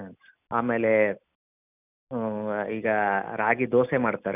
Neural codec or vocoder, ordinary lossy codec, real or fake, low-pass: none; none; real; 3.6 kHz